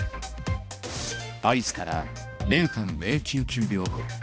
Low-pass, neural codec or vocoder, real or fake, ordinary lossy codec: none; codec, 16 kHz, 1 kbps, X-Codec, HuBERT features, trained on balanced general audio; fake; none